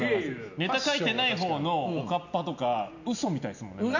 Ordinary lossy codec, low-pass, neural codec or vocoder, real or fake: none; 7.2 kHz; none; real